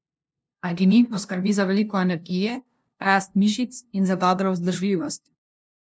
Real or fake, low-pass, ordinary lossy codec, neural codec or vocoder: fake; none; none; codec, 16 kHz, 0.5 kbps, FunCodec, trained on LibriTTS, 25 frames a second